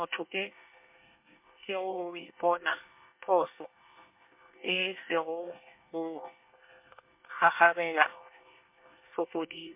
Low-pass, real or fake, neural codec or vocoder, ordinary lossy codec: 3.6 kHz; fake; codec, 24 kHz, 1 kbps, SNAC; MP3, 32 kbps